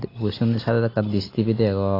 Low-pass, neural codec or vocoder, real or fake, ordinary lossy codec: 5.4 kHz; none; real; AAC, 24 kbps